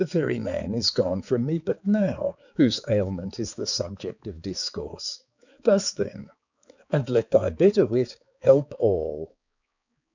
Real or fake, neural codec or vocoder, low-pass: fake; codec, 16 kHz, 4 kbps, X-Codec, HuBERT features, trained on balanced general audio; 7.2 kHz